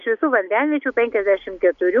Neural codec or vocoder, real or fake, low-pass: none; real; 7.2 kHz